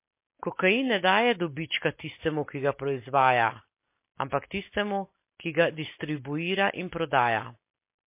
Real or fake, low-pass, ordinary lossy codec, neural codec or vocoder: real; 3.6 kHz; MP3, 24 kbps; none